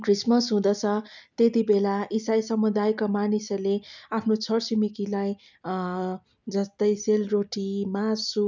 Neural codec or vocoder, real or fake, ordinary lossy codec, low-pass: none; real; none; 7.2 kHz